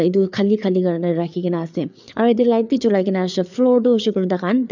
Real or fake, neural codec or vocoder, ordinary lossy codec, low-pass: fake; codec, 16 kHz, 4 kbps, FreqCodec, larger model; none; 7.2 kHz